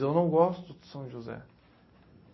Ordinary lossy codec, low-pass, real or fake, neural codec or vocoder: MP3, 24 kbps; 7.2 kHz; real; none